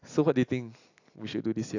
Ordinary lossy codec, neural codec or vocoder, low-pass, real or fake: AAC, 48 kbps; none; 7.2 kHz; real